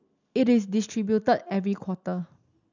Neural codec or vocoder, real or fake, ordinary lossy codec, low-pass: none; real; none; 7.2 kHz